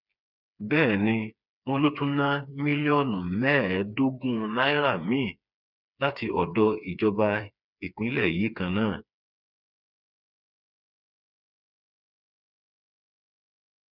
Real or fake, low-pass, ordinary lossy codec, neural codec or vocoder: fake; 5.4 kHz; none; codec, 16 kHz, 4 kbps, FreqCodec, smaller model